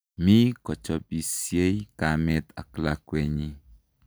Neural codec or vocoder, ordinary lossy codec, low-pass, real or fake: none; none; none; real